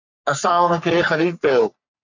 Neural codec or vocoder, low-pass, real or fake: codec, 44.1 kHz, 3.4 kbps, Pupu-Codec; 7.2 kHz; fake